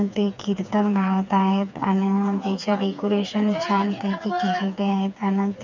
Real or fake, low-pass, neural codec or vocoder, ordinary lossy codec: fake; 7.2 kHz; codec, 16 kHz, 4 kbps, FreqCodec, smaller model; MP3, 64 kbps